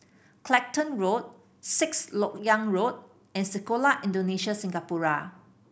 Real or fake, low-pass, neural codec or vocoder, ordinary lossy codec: real; none; none; none